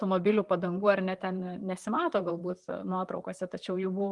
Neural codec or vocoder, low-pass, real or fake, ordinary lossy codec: vocoder, 44.1 kHz, 128 mel bands, Pupu-Vocoder; 10.8 kHz; fake; Opus, 32 kbps